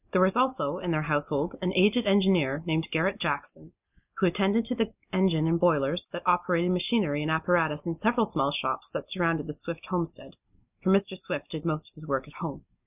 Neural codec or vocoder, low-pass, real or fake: none; 3.6 kHz; real